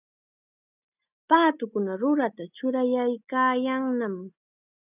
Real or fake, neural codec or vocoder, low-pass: real; none; 3.6 kHz